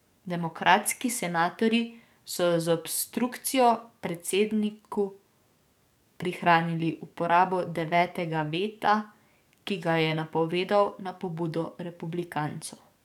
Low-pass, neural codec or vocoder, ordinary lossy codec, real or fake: 19.8 kHz; codec, 44.1 kHz, 7.8 kbps, DAC; none; fake